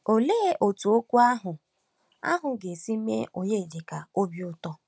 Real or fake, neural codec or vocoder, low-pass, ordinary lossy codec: real; none; none; none